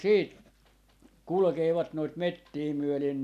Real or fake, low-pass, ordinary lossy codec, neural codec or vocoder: real; 14.4 kHz; none; none